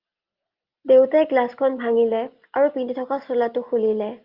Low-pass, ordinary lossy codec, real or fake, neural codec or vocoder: 5.4 kHz; Opus, 32 kbps; real; none